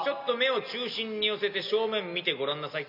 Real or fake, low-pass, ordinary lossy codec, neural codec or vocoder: real; 5.4 kHz; none; none